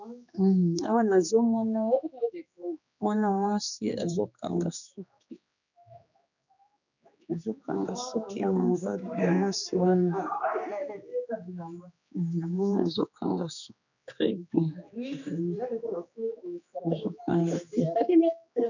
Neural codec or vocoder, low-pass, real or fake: codec, 16 kHz, 2 kbps, X-Codec, HuBERT features, trained on general audio; 7.2 kHz; fake